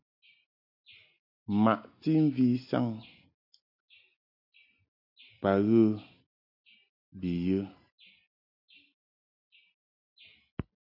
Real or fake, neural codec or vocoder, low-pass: real; none; 5.4 kHz